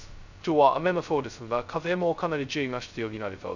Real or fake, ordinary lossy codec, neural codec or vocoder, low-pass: fake; none; codec, 16 kHz, 0.2 kbps, FocalCodec; 7.2 kHz